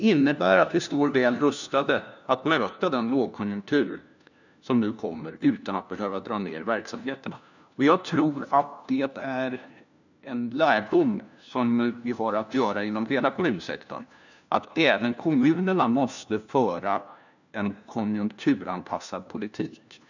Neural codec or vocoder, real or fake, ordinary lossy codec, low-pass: codec, 16 kHz, 1 kbps, FunCodec, trained on LibriTTS, 50 frames a second; fake; none; 7.2 kHz